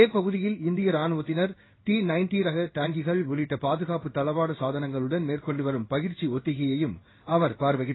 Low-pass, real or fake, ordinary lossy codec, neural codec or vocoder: 7.2 kHz; fake; AAC, 16 kbps; codec, 16 kHz in and 24 kHz out, 1 kbps, XY-Tokenizer